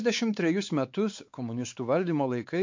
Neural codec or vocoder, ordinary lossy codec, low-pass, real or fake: codec, 16 kHz, 4 kbps, X-Codec, WavLM features, trained on Multilingual LibriSpeech; MP3, 48 kbps; 7.2 kHz; fake